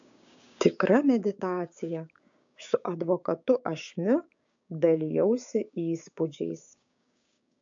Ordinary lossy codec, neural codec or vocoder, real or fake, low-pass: AAC, 64 kbps; codec, 16 kHz, 6 kbps, DAC; fake; 7.2 kHz